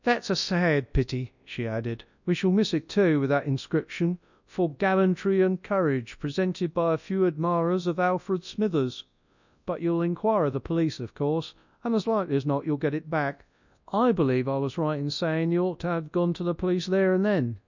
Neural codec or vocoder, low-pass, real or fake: codec, 24 kHz, 0.9 kbps, WavTokenizer, large speech release; 7.2 kHz; fake